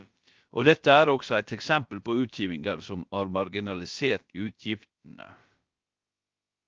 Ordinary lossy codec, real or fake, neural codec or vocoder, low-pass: Opus, 24 kbps; fake; codec, 16 kHz, about 1 kbps, DyCAST, with the encoder's durations; 7.2 kHz